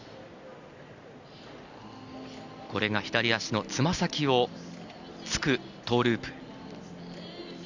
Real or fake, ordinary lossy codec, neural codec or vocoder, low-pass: real; none; none; 7.2 kHz